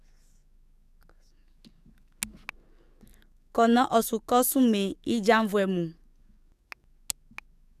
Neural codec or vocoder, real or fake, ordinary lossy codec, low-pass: codec, 44.1 kHz, 7.8 kbps, DAC; fake; none; 14.4 kHz